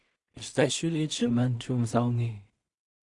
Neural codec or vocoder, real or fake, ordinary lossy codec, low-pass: codec, 16 kHz in and 24 kHz out, 0.4 kbps, LongCat-Audio-Codec, two codebook decoder; fake; Opus, 64 kbps; 10.8 kHz